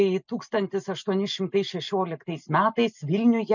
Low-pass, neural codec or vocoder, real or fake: 7.2 kHz; none; real